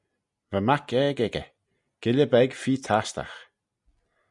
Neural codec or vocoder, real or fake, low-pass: none; real; 10.8 kHz